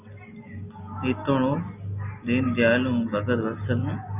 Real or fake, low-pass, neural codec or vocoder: real; 3.6 kHz; none